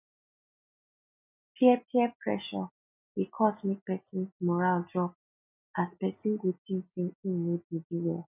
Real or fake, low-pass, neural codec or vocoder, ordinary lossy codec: real; 3.6 kHz; none; none